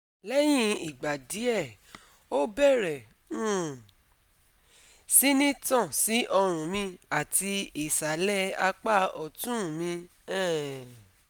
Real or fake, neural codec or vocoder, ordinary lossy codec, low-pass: real; none; none; none